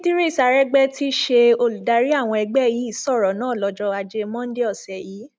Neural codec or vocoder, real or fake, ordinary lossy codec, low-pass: none; real; none; none